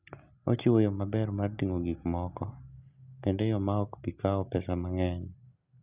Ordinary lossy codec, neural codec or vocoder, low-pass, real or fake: Opus, 64 kbps; none; 3.6 kHz; real